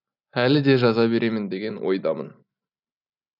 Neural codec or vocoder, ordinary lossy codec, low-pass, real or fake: none; none; 5.4 kHz; real